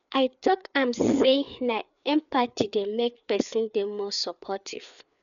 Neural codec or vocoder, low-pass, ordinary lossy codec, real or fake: codec, 16 kHz, 4 kbps, FreqCodec, larger model; 7.2 kHz; none; fake